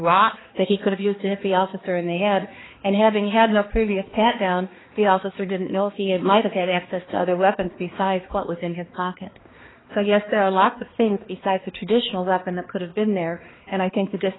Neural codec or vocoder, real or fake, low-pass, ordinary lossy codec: codec, 16 kHz, 2 kbps, X-Codec, HuBERT features, trained on balanced general audio; fake; 7.2 kHz; AAC, 16 kbps